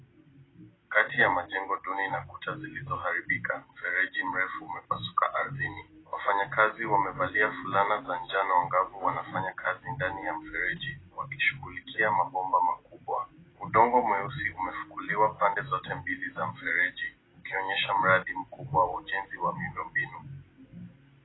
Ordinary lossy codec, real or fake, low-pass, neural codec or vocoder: AAC, 16 kbps; real; 7.2 kHz; none